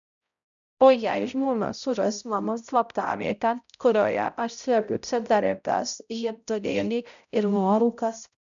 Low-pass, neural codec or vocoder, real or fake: 7.2 kHz; codec, 16 kHz, 0.5 kbps, X-Codec, HuBERT features, trained on balanced general audio; fake